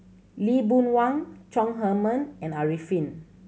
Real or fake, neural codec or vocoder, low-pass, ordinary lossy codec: real; none; none; none